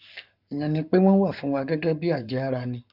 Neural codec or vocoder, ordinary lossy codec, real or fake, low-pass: codec, 44.1 kHz, 7.8 kbps, Pupu-Codec; none; fake; 5.4 kHz